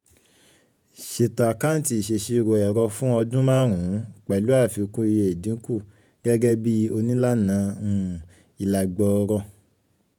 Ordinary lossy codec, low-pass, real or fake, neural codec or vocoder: none; none; fake; vocoder, 48 kHz, 128 mel bands, Vocos